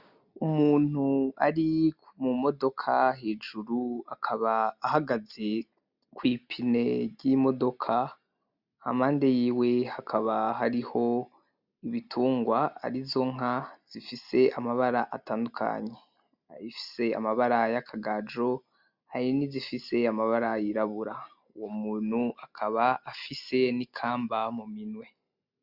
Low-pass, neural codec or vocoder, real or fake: 5.4 kHz; none; real